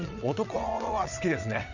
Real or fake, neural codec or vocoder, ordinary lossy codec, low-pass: fake; vocoder, 22.05 kHz, 80 mel bands, WaveNeXt; none; 7.2 kHz